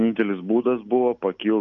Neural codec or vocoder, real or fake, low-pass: none; real; 7.2 kHz